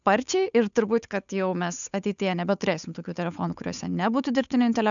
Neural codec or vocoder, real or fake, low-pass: codec, 16 kHz, 8 kbps, FunCodec, trained on Chinese and English, 25 frames a second; fake; 7.2 kHz